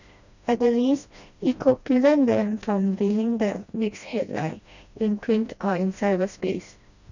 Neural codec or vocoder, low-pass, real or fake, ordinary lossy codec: codec, 16 kHz, 1 kbps, FreqCodec, smaller model; 7.2 kHz; fake; none